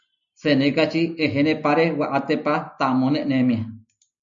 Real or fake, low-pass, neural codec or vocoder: real; 7.2 kHz; none